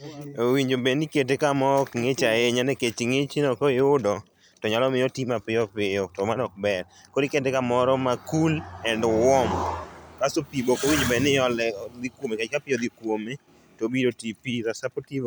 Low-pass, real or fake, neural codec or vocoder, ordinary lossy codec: none; real; none; none